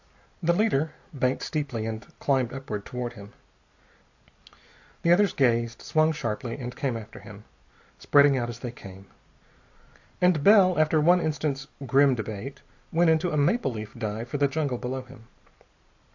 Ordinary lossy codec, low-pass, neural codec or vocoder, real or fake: AAC, 48 kbps; 7.2 kHz; none; real